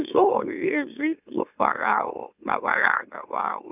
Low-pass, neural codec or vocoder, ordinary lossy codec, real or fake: 3.6 kHz; autoencoder, 44.1 kHz, a latent of 192 numbers a frame, MeloTTS; none; fake